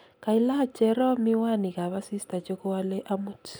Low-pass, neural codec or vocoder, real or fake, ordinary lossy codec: none; none; real; none